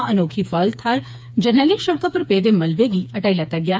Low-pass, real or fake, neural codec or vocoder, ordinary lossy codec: none; fake; codec, 16 kHz, 4 kbps, FreqCodec, smaller model; none